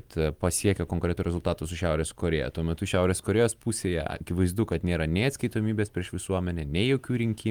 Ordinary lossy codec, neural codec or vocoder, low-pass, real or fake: Opus, 32 kbps; none; 19.8 kHz; real